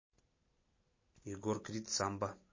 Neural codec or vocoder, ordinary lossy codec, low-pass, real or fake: none; MP3, 32 kbps; 7.2 kHz; real